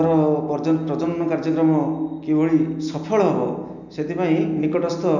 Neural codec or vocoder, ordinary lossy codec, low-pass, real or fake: none; none; 7.2 kHz; real